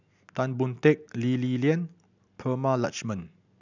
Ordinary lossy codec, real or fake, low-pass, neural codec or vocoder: none; real; 7.2 kHz; none